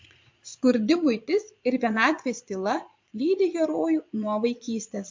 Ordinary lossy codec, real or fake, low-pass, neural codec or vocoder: MP3, 48 kbps; fake; 7.2 kHz; vocoder, 22.05 kHz, 80 mel bands, Vocos